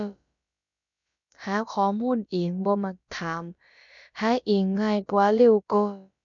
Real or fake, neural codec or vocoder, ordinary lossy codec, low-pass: fake; codec, 16 kHz, about 1 kbps, DyCAST, with the encoder's durations; none; 7.2 kHz